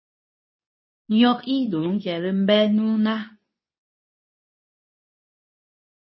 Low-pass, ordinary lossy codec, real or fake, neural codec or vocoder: 7.2 kHz; MP3, 24 kbps; fake; codec, 24 kHz, 0.9 kbps, WavTokenizer, medium speech release version 2